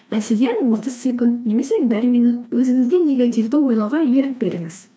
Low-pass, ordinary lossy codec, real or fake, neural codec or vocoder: none; none; fake; codec, 16 kHz, 1 kbps, FreqCodec, larger model